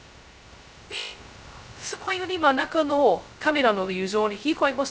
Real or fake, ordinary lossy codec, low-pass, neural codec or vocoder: fake; none; none; codec, 16 kHz, 0.2 kbps, FocalCodec